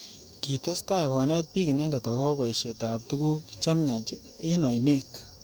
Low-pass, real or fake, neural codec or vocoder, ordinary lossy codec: none; fake; codec, 44.1 kHz, 2.6 kbps, DAC; none